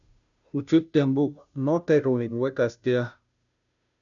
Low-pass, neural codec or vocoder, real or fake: 7.2 kHz; codec, 16 kHz, 0.5 kbps, FunCodec, trained on Chinese and English, 25 frames a second; fake